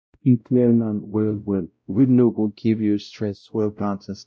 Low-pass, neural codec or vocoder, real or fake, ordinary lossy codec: none; codec, 16 kHz, 0.5 kbps, X-Codec, WavLM features, trained on Multilingual LibriSpeech; fake; none